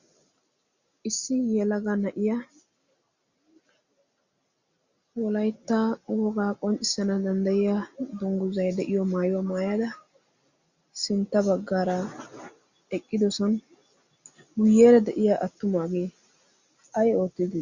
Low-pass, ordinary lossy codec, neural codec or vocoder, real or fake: 7.2 kHz; Opus, 64 kbps; none; real